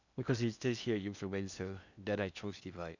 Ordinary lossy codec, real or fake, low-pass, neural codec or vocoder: none; fake; 7.2 kHz; codec, 16 kHz in and 24 kHz out, 0.8 kbps, FocalCodec, streaming, 65536 codes